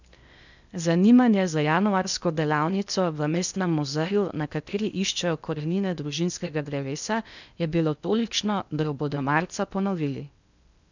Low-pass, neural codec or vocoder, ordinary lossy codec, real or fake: 7.2 kHz; codec, 16 kHz in and 24 kHz out, 0.8 kbps, FocalCodec, streaming, 65536 codes; none; fake